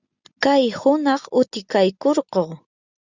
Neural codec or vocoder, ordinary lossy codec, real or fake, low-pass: none; Opus, 64 kbps; real; 7.2 kHz